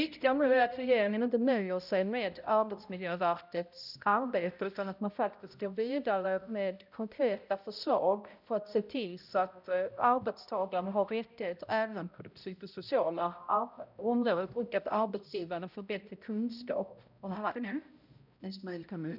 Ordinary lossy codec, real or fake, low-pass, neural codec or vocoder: none; fake; 5.4 kHz; codec, 16 kHz, 0.5 kbps, X-Codec, HuBERT features, trained on balanced general audio